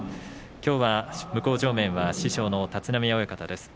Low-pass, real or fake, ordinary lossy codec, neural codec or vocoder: none; real; none; none